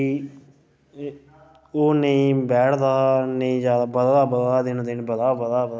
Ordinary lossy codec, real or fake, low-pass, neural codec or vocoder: none; real; none; none